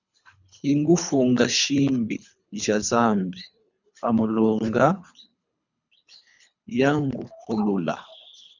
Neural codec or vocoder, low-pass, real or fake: codec, 24 kHz, 3 kbps, HILCodec; 7.2 kHz; fake